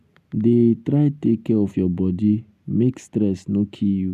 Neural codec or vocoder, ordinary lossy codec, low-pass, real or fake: none; none; 14.4 kHz; real